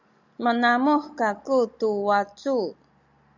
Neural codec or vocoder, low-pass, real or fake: none; 7.2 kHz; real